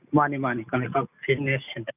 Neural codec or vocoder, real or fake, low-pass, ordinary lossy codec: none; real; 3.6 kHz; none